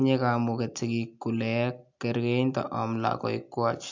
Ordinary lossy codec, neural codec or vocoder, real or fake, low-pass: MP3, 64 kbps; none; real; 7.2 kHz